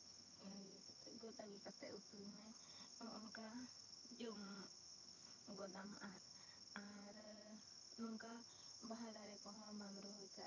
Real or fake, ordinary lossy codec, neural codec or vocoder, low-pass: fake; none; vocoder, 22.05 kHz, 80 mel bands, HiFi-GAN; 7.2 kHz